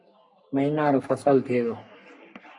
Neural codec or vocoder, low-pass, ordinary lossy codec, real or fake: codec, 44.1 kHz, 3.4 kbps, Pupu-Codec; 10.8 kHz; MP3, 64 kbps; fake